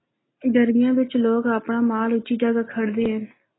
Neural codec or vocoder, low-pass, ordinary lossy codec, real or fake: none; 7.2 kHz; AAC, 16 kbps; real